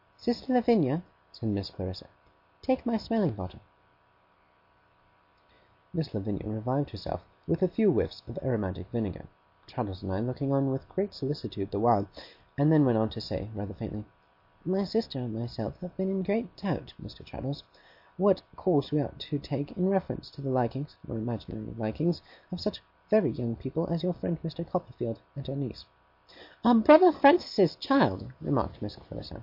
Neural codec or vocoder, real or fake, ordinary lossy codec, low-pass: none; real; MP3, 32 kbps; 5.4 kHz